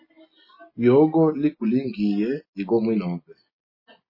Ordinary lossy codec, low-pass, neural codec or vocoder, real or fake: MP3, 24 kbps; 5.4 kHz; none; real